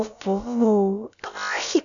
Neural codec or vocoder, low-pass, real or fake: codec, 16 kHz, about 1 kbps, DyCAST, with the encoder's durations; 7.2 kHz; fake